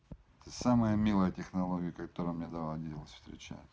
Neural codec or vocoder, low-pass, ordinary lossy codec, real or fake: none; none; none; real